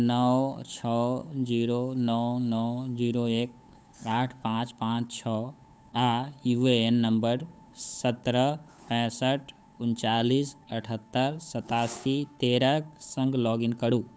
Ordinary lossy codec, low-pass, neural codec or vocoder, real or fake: none; none; codec, 16 kHz, 8 kbps, FunCodec, trained on Chinese and English, 25 frames a second; fake